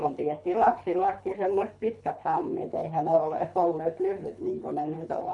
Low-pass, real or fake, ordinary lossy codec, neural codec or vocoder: 10.8 kHz; fake; none; codec, 24 kHz, 3 kbps, HILCodec